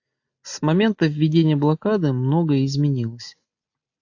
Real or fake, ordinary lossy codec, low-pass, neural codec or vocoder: real; AAC, 48 kbps; 7.2 kHz; none